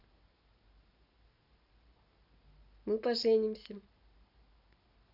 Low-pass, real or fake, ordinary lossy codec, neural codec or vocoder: 5.4 kHz; real; none; none